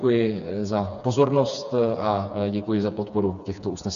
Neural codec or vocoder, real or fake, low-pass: codec, 16 kHz, 4 kbps, FreqCodec, smaller model; fake; 7.2 kHz